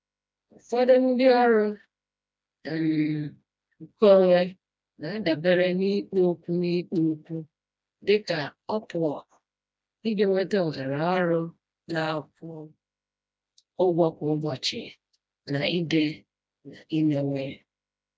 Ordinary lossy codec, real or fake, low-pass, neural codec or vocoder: none; fake; none; codec, 16 kHz, 1 kbps, FreqCodec, smaller model